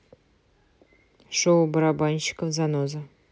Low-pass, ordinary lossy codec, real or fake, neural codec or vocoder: none; none; real; none